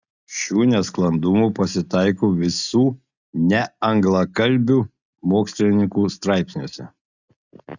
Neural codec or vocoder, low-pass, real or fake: none; 7.2 kHz; real